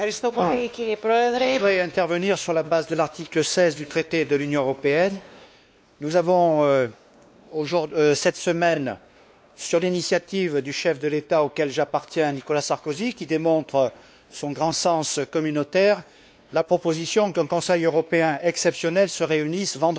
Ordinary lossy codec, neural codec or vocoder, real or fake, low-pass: none; codec, 16 kHz, 2 kbps, X-Codec, WavLM features, trained on Multilingual LibriSpeech; fake; none